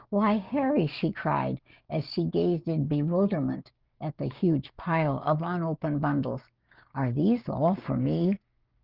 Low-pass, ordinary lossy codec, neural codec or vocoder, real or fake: 5.4 kHz; Opus, 32 kbps; codec, 16 kHz, 6 kbps, DAC; fake